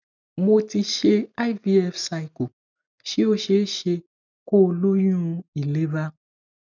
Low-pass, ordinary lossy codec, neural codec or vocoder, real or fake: 7.2 kHz; none; none; real